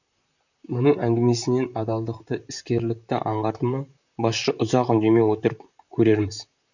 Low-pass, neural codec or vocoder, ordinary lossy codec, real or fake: 7.2 kHz; vocoder, 44.1 kHz, 128 mel bands, Pupu-Vocoder; none; fake